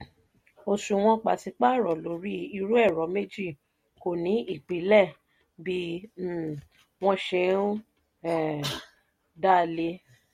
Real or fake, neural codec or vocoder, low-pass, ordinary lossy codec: real; none; 19.8 kHz; MP3, 64 kbps